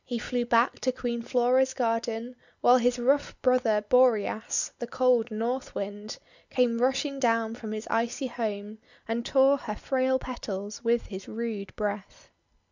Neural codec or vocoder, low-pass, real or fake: none; 7.2 kHz; real